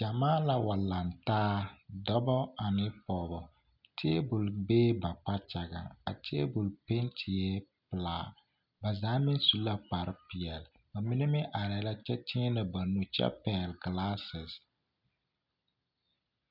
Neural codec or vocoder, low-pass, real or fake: none; 5.4 kHz; real